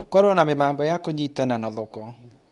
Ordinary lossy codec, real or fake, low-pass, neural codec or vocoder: none; fake; 10.8 kHz; codec, 24 kHz, 0.9 kbps, WavTokenizer, medium speech release version 2